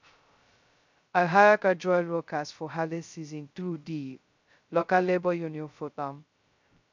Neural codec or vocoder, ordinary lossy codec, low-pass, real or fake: codec, 16 kHz, 0.2 kbps, FocalCodec; MP3, 64 kbps; 7.2 kHz; fake